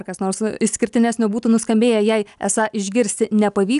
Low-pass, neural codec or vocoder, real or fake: 10.8 kHz; none; real